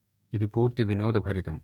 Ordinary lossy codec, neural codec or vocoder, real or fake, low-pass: none; codec, 44.1 kHz, 2.6 kbps, DAC; fake; 19.8 kHz